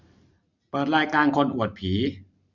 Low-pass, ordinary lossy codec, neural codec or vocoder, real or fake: 7.2 kHz; none; none; real